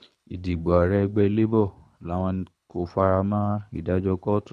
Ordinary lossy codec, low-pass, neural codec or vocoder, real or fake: none; none; codec, 24 kHz, 6 kbps, HILCodec; fake